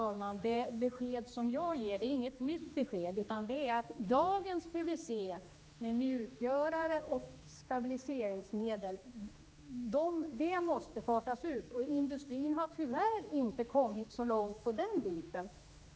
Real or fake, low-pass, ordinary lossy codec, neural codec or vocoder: fake; none; none; codec, 16 kHz, 2 kbps, X-Codec, HuBERT features, trained on general audio